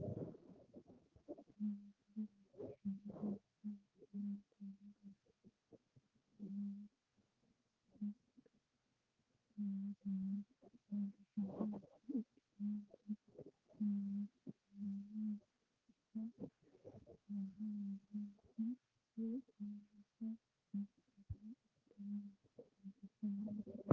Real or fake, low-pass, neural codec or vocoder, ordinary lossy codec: fake; 7.2 kHz; codec, 16 kHz, 4 kbps, X-Codec, HuBERT features, trained on general audio; Opus, 16 kbps